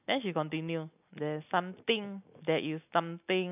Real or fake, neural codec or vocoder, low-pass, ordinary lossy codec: real; none; 3.6 kHz; AAC, 32 kbps